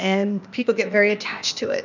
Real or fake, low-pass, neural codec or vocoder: fake; 7.2 kHz; codec, 16 kHz, 0.8 kbps, ZipCodec